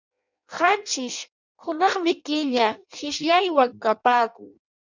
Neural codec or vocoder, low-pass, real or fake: codec, 16 kHz in and 24 kHz out, 0.6 kbps, FireRedTTS-2 codec; 7.2 kHz; fake